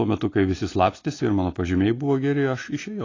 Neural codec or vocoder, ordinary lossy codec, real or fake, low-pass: none; AAC, 32 kbps; real; 7.2 kHz